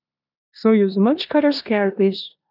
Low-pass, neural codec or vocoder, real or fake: 5.4 kHz; codec, 16 kHz in and 24 kHz out, 0.9 kbps, LongCat-Audio-Codec, four codebook decoder; fake